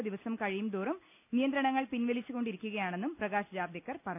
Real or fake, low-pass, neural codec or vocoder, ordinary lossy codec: real; 3.6 kHz; none; none